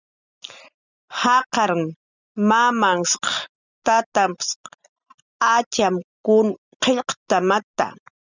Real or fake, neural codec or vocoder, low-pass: real; none; 7.2 kHz